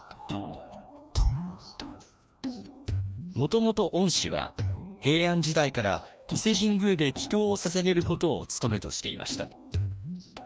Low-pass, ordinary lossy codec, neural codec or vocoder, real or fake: none; none; codec, 16 kHz, 1 kbps, FreqCodec, larger model; fake